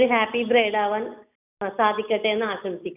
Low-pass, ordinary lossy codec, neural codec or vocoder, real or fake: 3.6 kHz; none; none; real